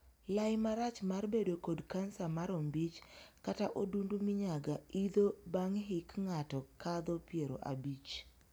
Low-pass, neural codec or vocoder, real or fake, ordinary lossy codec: none; none; real; none